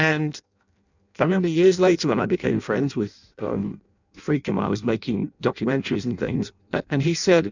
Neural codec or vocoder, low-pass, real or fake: codec, 16 kHz in and 24 kHz out, 0.6 kbps, FireRedTTS-2 codec; 7.2 kHz; fake